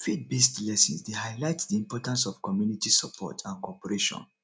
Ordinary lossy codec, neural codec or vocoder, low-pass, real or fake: none; none; none; real